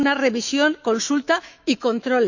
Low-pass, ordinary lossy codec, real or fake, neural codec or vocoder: 7.2 kHz; none; fake; autoencoder, 48 kHz, 128 numbers a frame, DAC-VAE, trained on Japanese speech